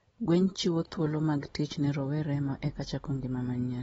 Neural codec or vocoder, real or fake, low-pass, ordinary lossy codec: none; real; 19.8 kHz; AAC, 24 kbps